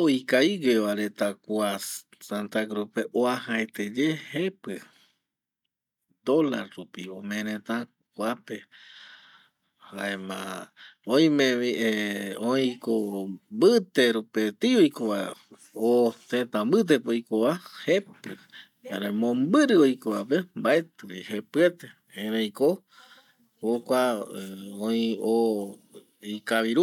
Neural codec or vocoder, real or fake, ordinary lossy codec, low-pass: none; real; none; 19.8 kHz